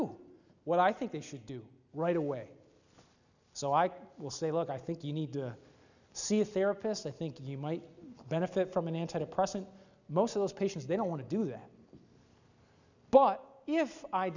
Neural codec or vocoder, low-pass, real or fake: none; 7.2 kHz; real